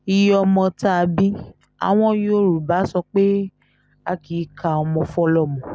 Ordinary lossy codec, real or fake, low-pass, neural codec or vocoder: none; real; none; none